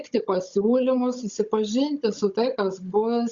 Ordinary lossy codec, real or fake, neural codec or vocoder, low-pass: Opus, 64 kbps; fake; codec, 16 kHz, 16 kbps, FunCodec, trained on Chinese and English, 50 frames a second; 7.2 kHz